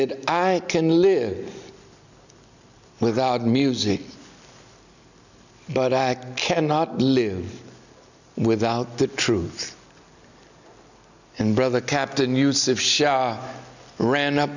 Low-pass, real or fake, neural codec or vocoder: 7.2 kHz; real; none